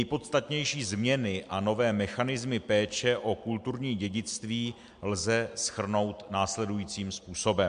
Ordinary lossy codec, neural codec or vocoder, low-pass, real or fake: MP3, 64 kbps; none; 9.9 kHz; real